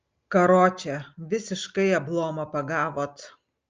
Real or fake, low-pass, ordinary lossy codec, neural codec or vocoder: real; 7.2 kHz; Opus, 24 kbps; none